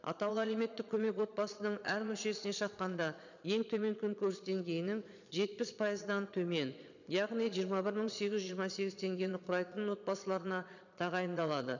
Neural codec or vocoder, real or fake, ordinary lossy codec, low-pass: vocoder, 22.05 kHz, 80 mel bands, Vocos; fake; none; 7.2 kHz